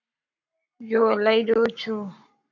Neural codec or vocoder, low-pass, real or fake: codec, 44.1 kHz, 7.8 kbps, Pupu-Codec; 7.2 kHz; fake